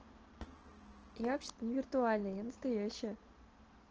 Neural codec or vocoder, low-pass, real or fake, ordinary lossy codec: none; 7.2 kHz; real; Opus, 16 kbps